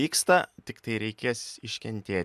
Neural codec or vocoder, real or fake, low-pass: none; real; 14.4 kHz